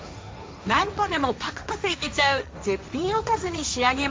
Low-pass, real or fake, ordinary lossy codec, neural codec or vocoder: none; fake; none; codec, 16 kHz, 1.1 kbps, Voila-Tokenizer